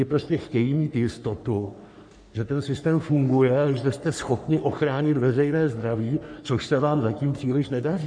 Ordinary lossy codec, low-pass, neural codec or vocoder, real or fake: MP3, 96 kbps; 9.9 kHz; codec, 44.1 kHz, 3.4 kbps, Pupu-Codec; fake